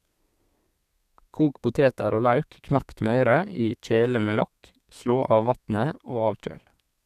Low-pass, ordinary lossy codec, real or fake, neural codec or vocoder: 14.4 kHz; none; fake; codec, 32 kHz, 1.9 kbps, SNAC